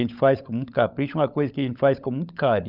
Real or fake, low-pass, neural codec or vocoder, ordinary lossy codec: fake; 5.4 kHz; codec, 16 kHz, 16 kbps, FunCodec, trained on LibriTTS, 50 frames a second; Opus, 64 kbps